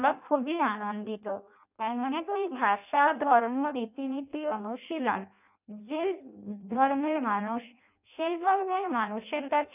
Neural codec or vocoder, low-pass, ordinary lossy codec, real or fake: codec, 16 kHz in and 24 kHz out, 0.6 kbps, FireRedTTS-2 codec; 3.6 kHz; none; fake